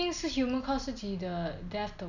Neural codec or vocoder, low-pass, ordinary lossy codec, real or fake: none; 7.2 kHz; none; real